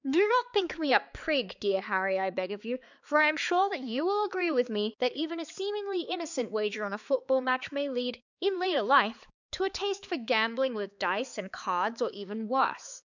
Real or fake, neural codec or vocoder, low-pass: fake; codec, 16 kHz, 4 kbps, X-Codec, HuBERT features, trained on balanced general audio; 7.2 kHz